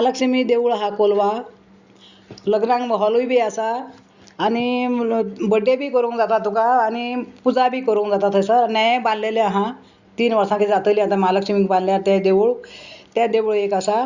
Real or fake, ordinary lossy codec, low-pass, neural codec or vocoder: real; Opus, 64 kbps; 7.2 kHz; none